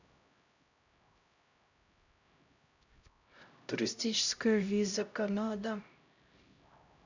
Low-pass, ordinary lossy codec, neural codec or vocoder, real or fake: 7.2 kHz; none; codec, 16 kHz, 0.5 kbps, X-Codec, HuBERT features, trained on LibriSpeech; fake